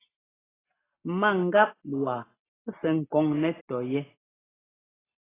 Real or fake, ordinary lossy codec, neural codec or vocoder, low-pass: real; AAC, 16 kbps; none; 3.6 kHz